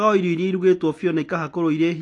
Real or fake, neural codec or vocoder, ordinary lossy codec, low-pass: real; none; AAC, 48 kbps; 10.8 kHz